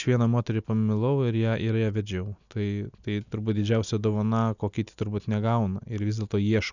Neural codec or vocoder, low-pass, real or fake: none; 7.2 kHz; real